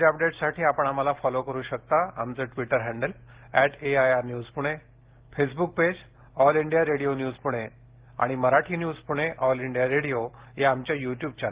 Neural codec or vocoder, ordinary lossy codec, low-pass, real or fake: none; Opus, 16 kbps; 3.6 kHz; real